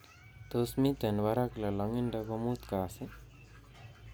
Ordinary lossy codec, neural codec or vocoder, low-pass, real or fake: none; none; none; real